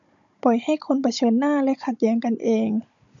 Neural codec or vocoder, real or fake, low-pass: codec, 16 kHz, 16 kbps, FunCodec, trained on Chinese and English, 50 frames a second; fake; 7.2 kHz